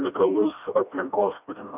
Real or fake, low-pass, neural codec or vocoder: fake; 3.6 kHz; codec, 16 kHz, 1 kbps, FreqCodec, smaller model